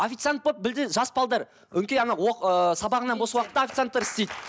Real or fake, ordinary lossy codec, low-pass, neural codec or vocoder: real; none; none; none